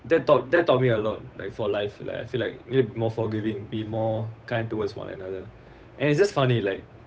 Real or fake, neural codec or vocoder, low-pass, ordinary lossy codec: fake; codec, 16 kHz, 8 kbps, FunCodec, trained on Chinese and English, 25 frames a second; none; none